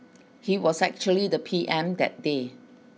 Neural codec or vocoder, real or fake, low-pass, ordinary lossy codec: none; real; none; none